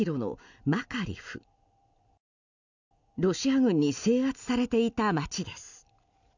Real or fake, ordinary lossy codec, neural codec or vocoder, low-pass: real; none; none; 7.2 kHz